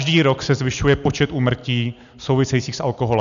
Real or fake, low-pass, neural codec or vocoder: real; 7.2 kHz; none